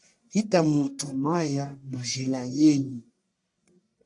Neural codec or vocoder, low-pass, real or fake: codec, 44.1 kHz, 1.7 kbps, Pupu-Codec; 10.8 kHz; fake